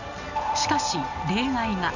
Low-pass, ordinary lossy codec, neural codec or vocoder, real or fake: 7.2 kHz; none; none; real